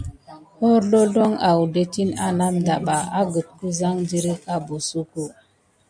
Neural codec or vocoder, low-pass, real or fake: none; 9.9 kHz; real